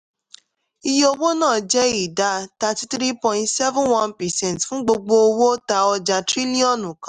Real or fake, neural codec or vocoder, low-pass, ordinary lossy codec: real; none; 10.8 kHz; none